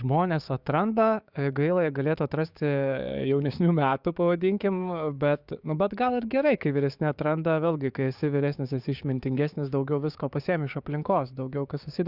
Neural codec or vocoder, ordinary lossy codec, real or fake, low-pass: codec, 16 kHz, 6 kbps, DAC; Opus, 64 kbps; fake; 5.4 kHz